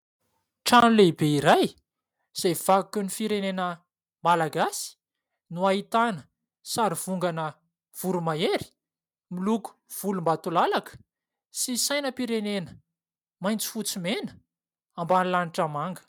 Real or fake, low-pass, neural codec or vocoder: real; 19.8 kHz; none